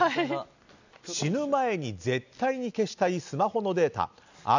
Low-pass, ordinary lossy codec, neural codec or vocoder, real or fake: 7.2 kHz; none; none; real